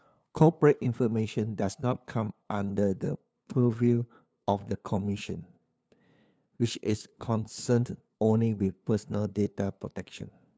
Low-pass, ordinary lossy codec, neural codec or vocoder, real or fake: none; none; codec, 16 kHz, 2 kbps, FunCodec, trained on LibriTTS, 25 frames a second; fake